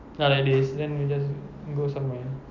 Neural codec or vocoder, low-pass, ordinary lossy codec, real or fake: none; 7.2 kHz; none; real